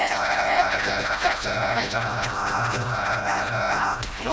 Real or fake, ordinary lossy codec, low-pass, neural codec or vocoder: fake; none; none; codec, 16 kHz, 0.5 kbps, FreqCodec, smaller model